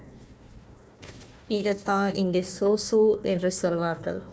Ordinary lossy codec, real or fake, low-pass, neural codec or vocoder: none; fake; none; codec, 16 kHz, 1 kbps, FunCodec, trained on Chinese and English, 50 frames a second